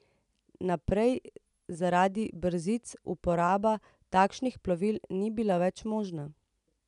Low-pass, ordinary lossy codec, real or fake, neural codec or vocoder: 10.8 kHz; none; real; none